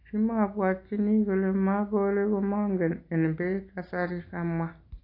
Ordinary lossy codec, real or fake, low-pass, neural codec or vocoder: AAC, 32 kbps; real; 5.4 kHz; none